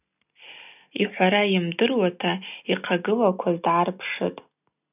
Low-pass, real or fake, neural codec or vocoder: 3.6 kHz; real; none